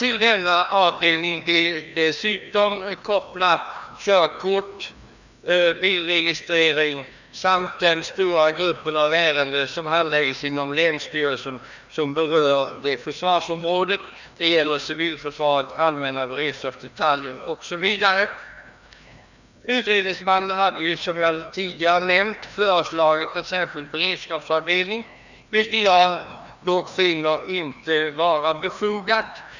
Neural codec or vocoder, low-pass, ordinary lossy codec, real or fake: codec, 16 kHz, 1 kbps, FreqCodec, larger model; 7.2 kHz; none; fake